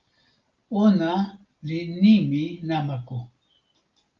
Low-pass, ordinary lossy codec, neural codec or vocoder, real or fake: 7.2 kHz; Opus, 32 kbps; none; real